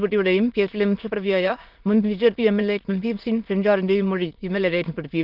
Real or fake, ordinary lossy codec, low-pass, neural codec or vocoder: fake; Opus, 32 kbps; 5.4 kHz; autoencoder, 22.05 kHz, a latent of 192 numbers a frame, VITS, trained on many speakers